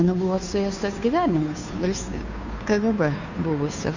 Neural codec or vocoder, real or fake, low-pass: codec, 16 kHz, 2 kbps, FunCodec, trained on Chinese and English, 25 frames a second; fake; 7.2 kHz